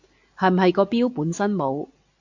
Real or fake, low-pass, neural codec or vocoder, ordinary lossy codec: real; 7.2 kHz; none; AAC, 48 kbps